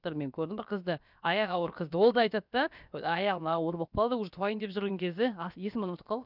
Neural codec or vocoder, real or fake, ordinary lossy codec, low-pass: codec, 16 kHz, about 1 kbps, DyCAST, with the encoder's durations; fake; none; 5.4 kHz